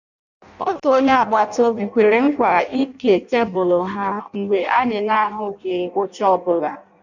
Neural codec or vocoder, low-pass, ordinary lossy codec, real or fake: codec, 16 kHz in and 24 kHz out, 0.6 kbps, FireRedTTS-2 codec; 7.2 kHz; none; fake